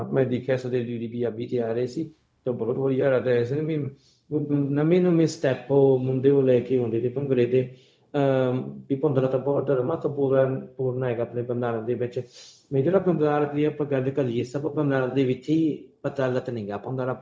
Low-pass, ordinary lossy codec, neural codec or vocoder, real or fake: none; none; codec, 16 kHz, 0.4 kbps, LongCat-Audio-Codec; fake